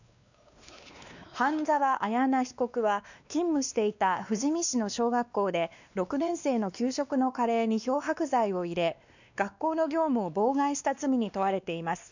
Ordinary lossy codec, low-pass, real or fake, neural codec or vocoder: none; 7.2 kHz; fake; codec, 16 kHz, 2 kbps, X-Codec, WavLM features, trained on Multilingual LibriSpeech